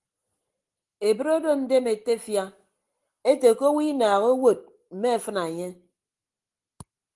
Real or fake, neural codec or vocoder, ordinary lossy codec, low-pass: real; none; Opus, 24 kbps; 10.8 kHz